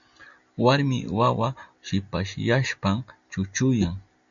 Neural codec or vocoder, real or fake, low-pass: none; real; 7.2 kHz